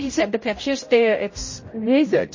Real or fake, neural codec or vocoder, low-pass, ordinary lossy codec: fake; codec, 16 kHz, 0.5 kbps, X-Codec, HuBERT features, trained on general audio; 7.2 kHz; MP3, 32 kbps